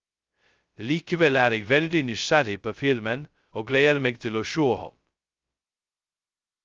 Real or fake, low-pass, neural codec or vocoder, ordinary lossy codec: fake; 7.2 kHz; codec, 16 kHz, 0.2 kbps, FocalCodec; Opus, 32 kbps